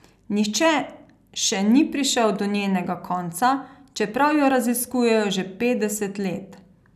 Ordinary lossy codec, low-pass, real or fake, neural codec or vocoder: none; 14.4 kHz; real; none